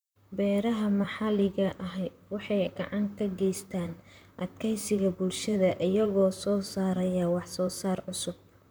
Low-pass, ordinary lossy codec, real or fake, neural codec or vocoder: none; none; fake; vocoder, 44.1 kHz, 128 mel bands, Pupu-Vocoder